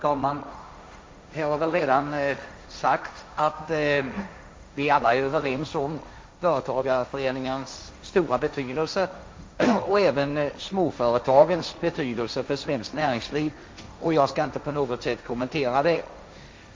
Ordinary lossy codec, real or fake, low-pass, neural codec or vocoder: none; fake; none; codec, 16 kHz, 1.1 kbps, Voila-Tokenizer